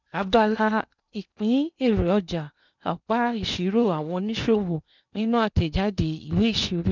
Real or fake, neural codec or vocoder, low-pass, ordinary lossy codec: fake; codec, 16 kHz in and 24 kHz out, 0.8 kbps, FocalCodec, streaming, 65536 codes; 7.2 kHz; none